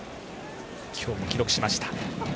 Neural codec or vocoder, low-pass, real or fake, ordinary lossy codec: none; none; real; none